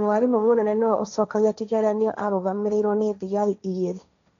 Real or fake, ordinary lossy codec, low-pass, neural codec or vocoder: fake; none; 7.2 kHz; codec, 16 kHz, 1.1 kbps, Voila-Tokenizer